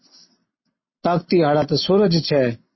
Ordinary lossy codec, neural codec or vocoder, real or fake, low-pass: MP3, 24 kbps; none; real; 7.2 kHz